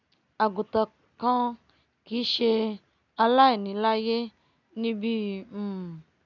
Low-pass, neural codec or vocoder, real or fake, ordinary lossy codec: 7.2 kHz; none; real; none